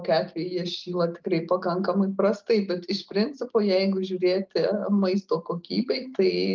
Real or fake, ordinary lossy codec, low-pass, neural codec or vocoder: real; Opus, 24 kbps; 7.2 kHz; none